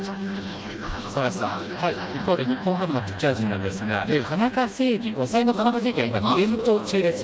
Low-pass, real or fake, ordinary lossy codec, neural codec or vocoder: none; fake; none; codec, 16 kHz, 1 kbps, FreqCodec, smaller model